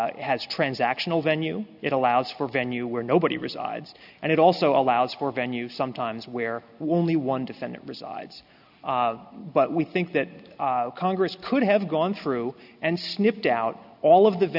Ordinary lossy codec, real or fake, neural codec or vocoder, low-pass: AAC, 48 kbps; real; none; 5.4 kHz